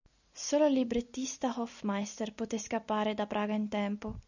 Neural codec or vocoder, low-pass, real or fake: none; 7.2 kHz; real